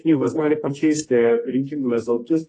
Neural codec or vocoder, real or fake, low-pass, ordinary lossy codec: codec, 24 kHz, 0.9 kbps, WavTokenizer, medium music audio release; fake; 10.8 kHz; AAC, 32 kbps